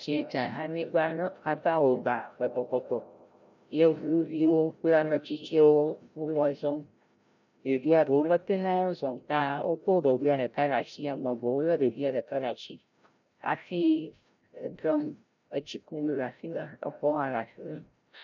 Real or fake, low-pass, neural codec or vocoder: fake; 7.2 kHz; codec, 16 kHz, 0.5 kbps, FreqCodec, larger model